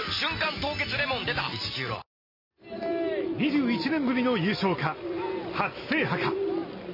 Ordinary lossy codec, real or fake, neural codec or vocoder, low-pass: MP3, 24 kbps; real; none; 5.4 kHz